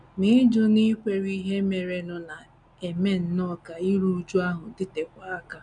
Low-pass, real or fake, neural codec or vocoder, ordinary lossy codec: 9.9 kHz; real; none; none